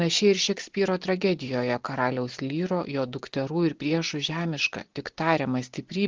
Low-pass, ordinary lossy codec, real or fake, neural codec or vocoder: 7.2 kHz; Opus, 16 kbps; real; none